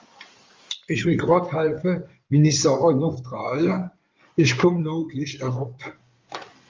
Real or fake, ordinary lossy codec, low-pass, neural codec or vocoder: fake; Opus, 32 kbps; 7.2 kHz; codec, 16 kHz in and 24 kHz out, 2.2 kbps, FireRedTTS-2 codec